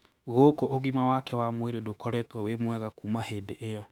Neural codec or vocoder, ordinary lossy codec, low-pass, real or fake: autoencoder, 48 kHz, 32 numbers a frame, DAC-VAE, trained on Japanese speech; none; 19.8 kHz; fake